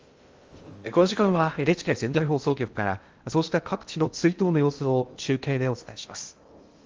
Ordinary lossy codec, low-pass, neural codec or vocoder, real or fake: Opus, 32 kbps; 7.2 kHz; codec, 16 kHz in and 24 kHz out, 0.6 kbps, FocalCodec, streaming, 2048 codes; fake